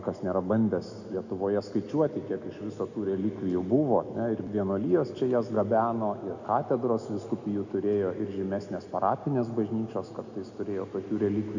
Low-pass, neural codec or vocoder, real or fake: 7.2 kHz; none; real